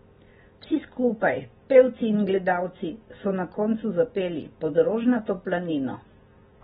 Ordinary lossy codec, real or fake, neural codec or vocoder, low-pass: AAC, 16 kbps; real; none; 19.8 kHz